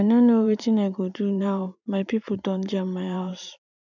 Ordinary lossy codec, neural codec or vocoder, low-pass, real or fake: none; vocoder, 44.1 kHz, 128 mel bands every 512 samples, BigVGAN v2; 7.2 kHz; fake